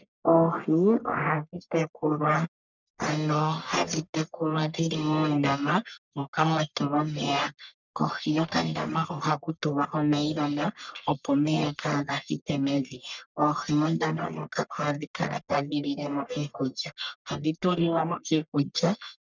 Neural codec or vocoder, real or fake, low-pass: codec, 44.1 kHz, 1.7 kbps, Pupu-Codec; fake; 7.2 kHz